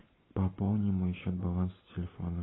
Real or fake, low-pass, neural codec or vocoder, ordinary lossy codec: real; 7.2 kHz; none; AAC, 16 kbps